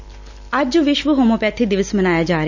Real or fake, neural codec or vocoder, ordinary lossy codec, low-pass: real; none; none; 7.2 kHz